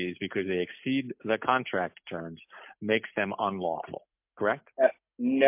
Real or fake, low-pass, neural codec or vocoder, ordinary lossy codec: real; 3.6 kHz; none; MP3, 32 kbps